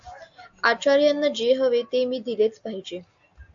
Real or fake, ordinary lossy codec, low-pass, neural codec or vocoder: real; AAC, 64 kbps; 7.2 kHz; none